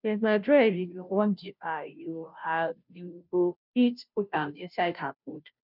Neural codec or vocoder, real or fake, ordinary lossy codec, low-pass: codec, 16 kHz, 0.5 kbps, FunCodec, trained on Chinese and English, 25 frames a second; fake; none; 5.4 kHz